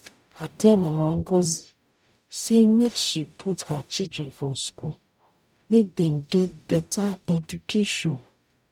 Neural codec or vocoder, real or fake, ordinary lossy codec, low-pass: codec, 44.1 kHz, 0.9 kbps, DAC; fake; none; 19.8 kHz